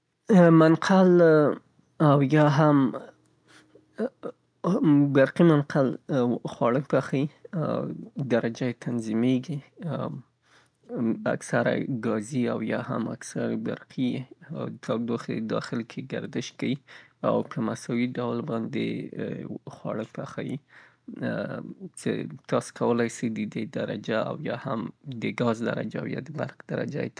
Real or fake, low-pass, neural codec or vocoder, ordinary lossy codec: real; 9.9 kHz; none; none